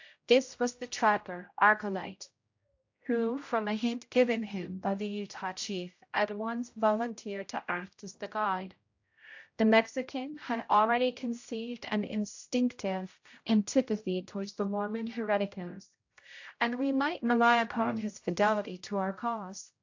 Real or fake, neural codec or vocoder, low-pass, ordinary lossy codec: fake; codec, 16 kHz, 0.5 kbps, X-Codec, HuBERT features, trained on general audio; 7.2 kHz; AAC, 48 kbps